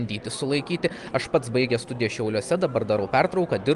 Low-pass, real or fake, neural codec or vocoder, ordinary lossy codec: 10.8 kHz; real; none; Opus, 32 kbps